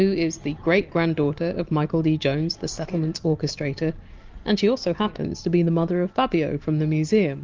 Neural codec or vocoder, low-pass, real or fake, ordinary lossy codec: none; 7.2 kHz; real; Opus, 32 kbps